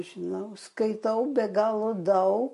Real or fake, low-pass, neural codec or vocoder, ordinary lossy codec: real; 14.4 kHz; none; MP3, 48 kbps